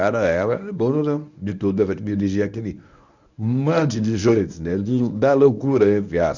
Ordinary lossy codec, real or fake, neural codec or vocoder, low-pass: none; fake; codec, 24 kHz, 0.9 kbps, WavTokenizer, medium speech release version 1; 7.2 kHz